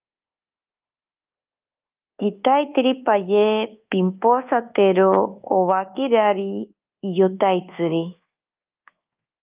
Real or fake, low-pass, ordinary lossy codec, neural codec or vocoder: fake; 3.6 kHz; Opus, 24 kbps; codec, 24 kHz, 1.2 kbps, DualCodec